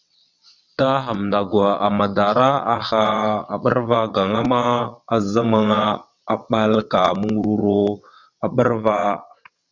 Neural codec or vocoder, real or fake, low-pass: vocoder, 22.05 kHz, 80 mel bands, WaveNeXt; fake; 7.2 kHz